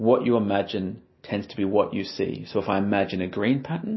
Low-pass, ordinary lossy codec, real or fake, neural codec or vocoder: 7.2 kHz; MP3, 24 kbps; real; none